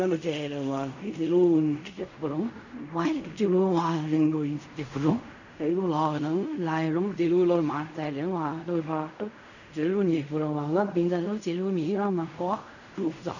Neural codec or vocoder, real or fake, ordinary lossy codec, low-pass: codec, 16 kHz in and 24 kHz out, 0.4 kbps, LongCat-Audio-Codec, fine tuned four codebook decoder; fake; none; 7.2 kHz